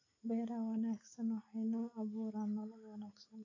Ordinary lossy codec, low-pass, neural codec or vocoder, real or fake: AAC, 32 kbps; 7.2 kHz; none; real